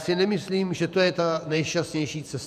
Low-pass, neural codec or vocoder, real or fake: 14.4 kHz; vocoder, 48 kHz, 128 mel bands, Vocos; fake